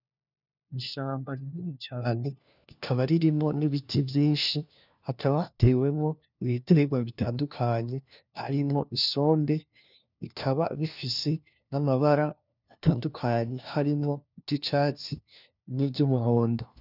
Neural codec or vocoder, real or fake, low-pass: codec, 16 kHz, 1 kbps, FunCodec, trained on LibriTTS, 50 frames a second; fake; 5.4 kHz